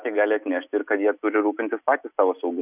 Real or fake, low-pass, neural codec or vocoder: real; 3.6 kHz; none